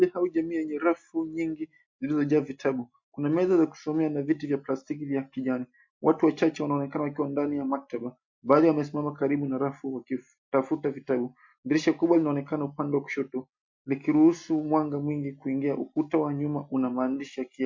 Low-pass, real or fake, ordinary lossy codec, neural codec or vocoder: 7.2 kHz; real; MP3, 48 kbps; none